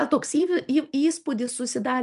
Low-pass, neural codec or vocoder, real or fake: 10.8 kHz; none; real